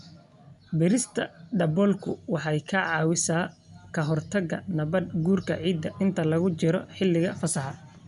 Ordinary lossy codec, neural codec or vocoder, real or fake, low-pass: none; none; real; 10.8 kHz